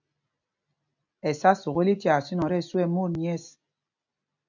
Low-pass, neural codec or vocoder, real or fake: 7.2 kHz; none; real